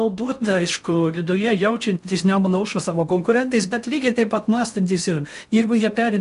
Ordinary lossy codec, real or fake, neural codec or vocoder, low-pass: MP3, 96 kbps; fake; codec, 16 kHz in and 24 kHz out, 0.6 kbps, FocalCodec, streaming, 4096 codes; 10.8 kHz